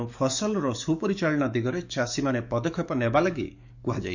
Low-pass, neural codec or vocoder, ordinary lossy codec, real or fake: 7.2 kHz; codec, 44.1 kHz, 7.8 kbps, DAC; none; fake